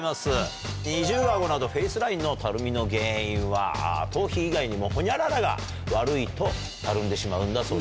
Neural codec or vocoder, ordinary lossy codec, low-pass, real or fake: none; none; none; real